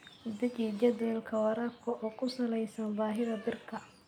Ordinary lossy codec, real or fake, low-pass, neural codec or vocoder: none; real; 19.8 kHz; none